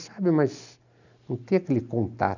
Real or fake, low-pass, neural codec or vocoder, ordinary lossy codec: real; 7.2 kHz; none; none